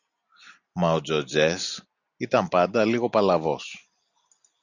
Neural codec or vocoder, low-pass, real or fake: none; 7.2 kHz; real